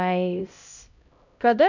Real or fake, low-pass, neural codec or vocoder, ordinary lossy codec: fake; 7.2 kHz; codec, 16 kHz, 0.5 kbps, X-Codec, HuBERT features, trained on LibriSpeech; none